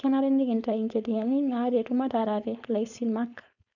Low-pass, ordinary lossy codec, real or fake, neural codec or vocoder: 7.2 kHz; none; fake; codec, 16 kHz, 4.8 kbps, FACodec